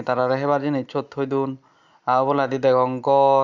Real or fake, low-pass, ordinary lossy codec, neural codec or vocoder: real; 7.2 kHz; Opus, 64 kbps; none